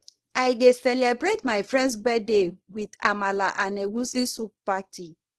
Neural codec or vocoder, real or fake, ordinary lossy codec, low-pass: codec, 24 kHz, 0.9 kbps, WavTokenizer, small release; fake; Opus, 16 kbps; 10.8 kHz